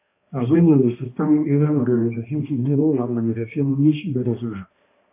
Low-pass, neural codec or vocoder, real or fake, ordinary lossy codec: 3.6 kHz; codec, 16 kHz, 1 kbps, X-Codec, HuBERT features, trained on balanced general audio; fake; AAC, 24 kbps